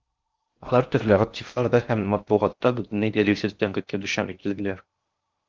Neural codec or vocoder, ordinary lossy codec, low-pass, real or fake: codec, 16 kHz in and 24 kHz out, 0.6 kbps, FocalCodec, streaming, 4096 codes; Opus, 24 kbps; 7.2 kHz; fake